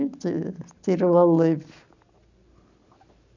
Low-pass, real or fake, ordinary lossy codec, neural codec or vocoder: 7.2 kHz; real; none; none